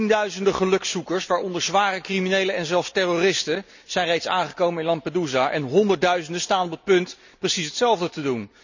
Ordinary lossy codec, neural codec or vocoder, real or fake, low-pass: none; none; real; 7.2 kHz